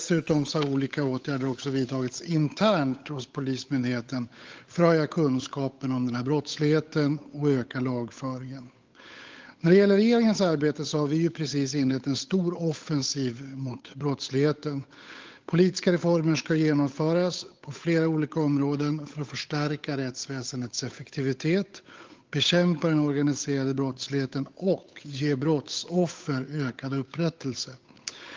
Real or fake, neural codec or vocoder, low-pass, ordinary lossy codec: fake; codec, 16 kHz, 8 kbps, FunCodec, trained on Chinese and English, 25 frames a second; 7.2 kHz; Opus, 24 kbps